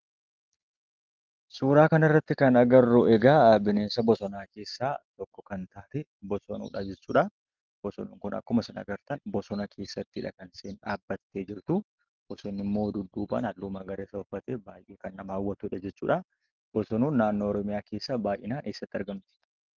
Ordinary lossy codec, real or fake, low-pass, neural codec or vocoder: Opus, 32 kbps; real; 7.2 kHz; none